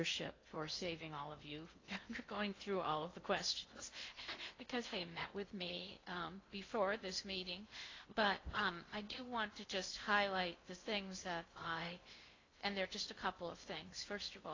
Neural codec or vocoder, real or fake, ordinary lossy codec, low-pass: codec, 16 kHz in and 24 kHz out, 0.6 kbps, FocalCodec, streaming, 2048 codes; fake; AAC, 32 kbps; 7.2 kHz